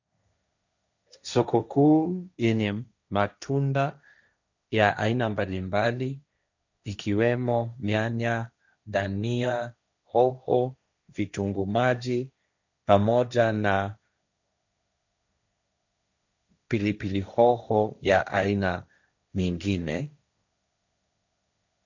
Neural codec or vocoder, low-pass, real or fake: codec, 16 kHz, 1.1 kbps, Voila-Tokenizer; 7.2 kHz; fake